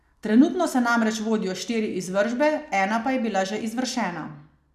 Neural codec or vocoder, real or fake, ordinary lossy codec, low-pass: none; real; none; 14.4 kHz